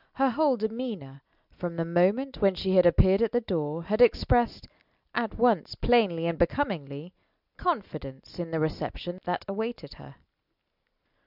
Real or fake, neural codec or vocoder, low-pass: real; none; 5.4 kHz